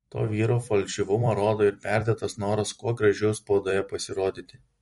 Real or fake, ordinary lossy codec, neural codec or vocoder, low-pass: fake; MP3, 48 kbps; vocoder, 44.1 kHz, 128 mel bands every 256 samples, BigVGAN v2; 19.8 kHz